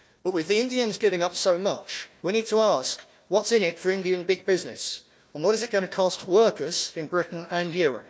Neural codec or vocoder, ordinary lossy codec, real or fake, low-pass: codec, 16 kHz, 1 kbps, FunCodec, trained on Chinese and English, 50 frames a second; none; fake; none